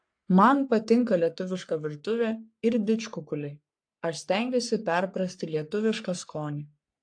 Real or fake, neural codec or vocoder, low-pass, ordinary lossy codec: fake; codec, 44.1 kHz, 3.4 kbps, Pupu-Codec; 9.9 kHz; AAC, 64 kbps